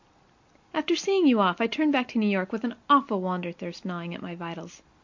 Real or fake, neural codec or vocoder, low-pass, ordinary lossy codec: real; none; 7.2 kHz; MP3, 48 kbps